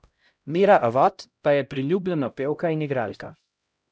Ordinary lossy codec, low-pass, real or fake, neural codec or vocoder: none; none; fake; codec, 16 kHz, 0.5 kbps, X-Codec, HuBERT features, trained on LibriSpeech